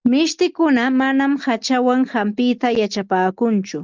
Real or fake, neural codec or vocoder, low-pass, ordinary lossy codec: real; none; 7.2 kHz; Opus, 24 kbps